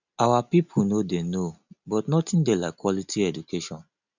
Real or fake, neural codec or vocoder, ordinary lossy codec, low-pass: real; none; none; 7.2 kHz